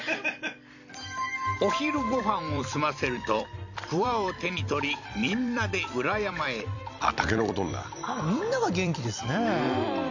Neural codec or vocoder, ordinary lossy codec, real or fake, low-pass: vocoder, 44.1 kHz, 128 mel bands every 256 samples, BigVGAN v2; none; fake; 7.2 kHz